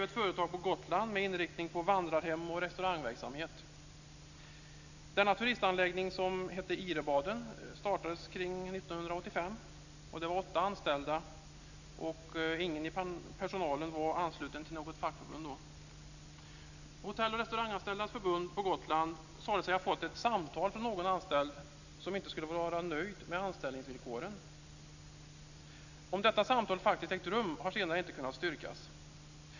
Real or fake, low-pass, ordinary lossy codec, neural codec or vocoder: real; 7.2 kHz; none; none